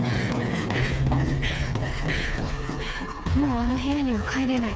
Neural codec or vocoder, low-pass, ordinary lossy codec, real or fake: codec, 16 kHz, 4 kbps, FreqCodec, smaller model; none; none; fake